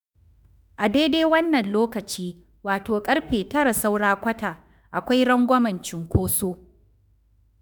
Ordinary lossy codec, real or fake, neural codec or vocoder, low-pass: none; fake; autoencoder, 48 kHz, 32 numbers a frame, DAC-VAE, trained on Japanese speech; none